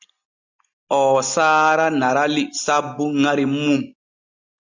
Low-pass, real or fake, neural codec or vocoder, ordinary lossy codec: 7.2 kHz; real; none; Opus, 64 kbps